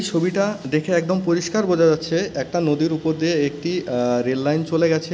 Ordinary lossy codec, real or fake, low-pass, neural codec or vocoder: none; real; none; none